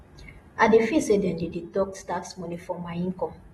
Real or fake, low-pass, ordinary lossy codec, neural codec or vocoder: real; 19.8 kHz; AAC, 32 kbps; none